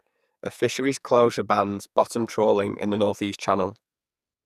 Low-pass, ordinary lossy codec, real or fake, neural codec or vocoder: 14.4 kHz; none; fake; codec, 44.1 kHz, 2.6 kbps, SNAC